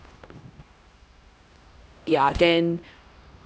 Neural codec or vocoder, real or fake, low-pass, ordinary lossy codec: codec, 16 kHz, 1 kbps, X-Codec, HuBERT features, trained on LibriSpeech; fake; none; none